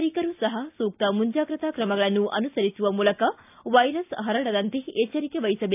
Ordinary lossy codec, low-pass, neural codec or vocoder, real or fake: AAC, 32 kbps; 3.6 kHz; none; real